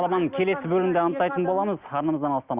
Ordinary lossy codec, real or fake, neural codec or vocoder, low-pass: Opus, 64 kbps; real; none; 3.6 kHz